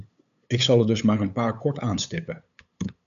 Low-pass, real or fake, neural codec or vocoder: 7.2 kHz; fake; codec, 16 kHz, 8 kbps, FunCodec, trained on LibriTTS, 25 frames a second